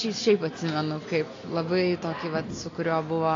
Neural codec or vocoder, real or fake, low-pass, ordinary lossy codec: none; real; 7.2 kHz; AAC, 32 kbps